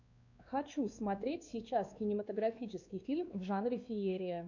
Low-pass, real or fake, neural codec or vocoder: 7.2 kHz; fake; codec, 16 kHz, 2 kbps, X-Codec, WavLM features, trained on Multilingual LibriSpeech